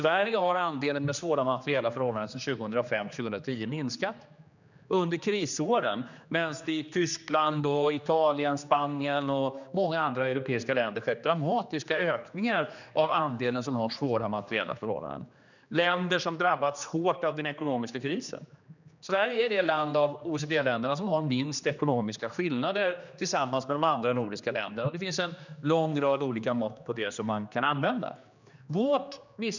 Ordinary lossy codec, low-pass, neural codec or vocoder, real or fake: none; 7.2 kHz; codec, 16 kHz, 2 kbps, X-Codec, HuBERT features, trained on general audio; fake